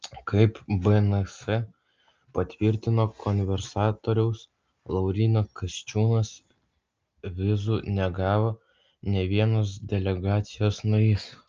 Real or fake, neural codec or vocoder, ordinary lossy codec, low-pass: real; none; Opus, 24 kbps; 7.2 kHz